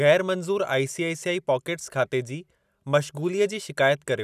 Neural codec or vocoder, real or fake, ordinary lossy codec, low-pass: none; real; none; 14.4 kHz